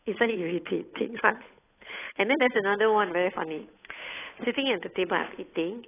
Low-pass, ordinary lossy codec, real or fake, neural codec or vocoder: 3.6 kHz; AAC, 16 kbps; fake; codec, 16 kHz, 8 kbps, FunCodec, trained on Chinese and English, 25 frames a second